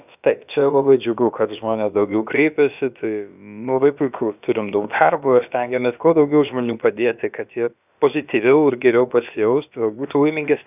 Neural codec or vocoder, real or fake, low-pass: codec, 16 kHz, about 1 kbps, DyCAST, with the encoder's durations; fake; 3.6 kHz